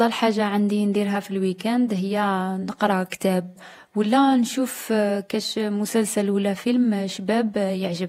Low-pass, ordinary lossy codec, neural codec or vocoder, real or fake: 19.8 kHz; AAC, 48 kbps; vocoder, 48 kHz, 128 mel bands, Vocos; fake